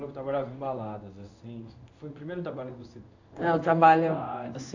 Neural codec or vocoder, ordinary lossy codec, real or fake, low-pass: codec, 16 kHz in and 24 kHz out, 1 kbps, XY-Tokenizer; AAC, 48 kbps; fake; 7.2 kHz